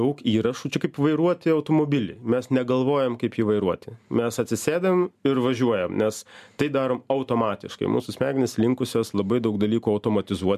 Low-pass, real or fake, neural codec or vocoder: 14.4 kHz; real; none